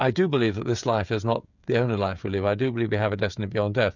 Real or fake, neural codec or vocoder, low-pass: fake; codec, 16 kHz, 16 kbps, FreqCodec, smaller model; 7.2 kHz